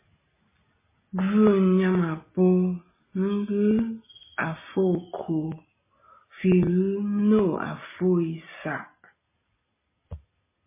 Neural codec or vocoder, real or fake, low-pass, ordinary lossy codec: none; real; 3.6 kHz; MP3, 16 kbps